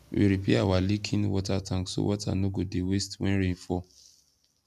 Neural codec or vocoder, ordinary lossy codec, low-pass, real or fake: none; none; 14.4 kHz; real